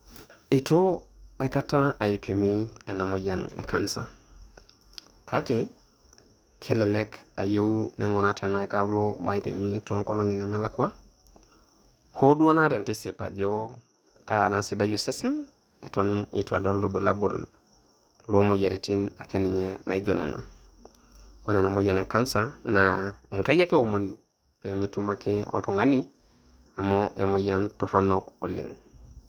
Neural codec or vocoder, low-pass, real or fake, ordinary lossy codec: codec, 44.1 kHz, 2.6 kbps, DAC; none; fake; none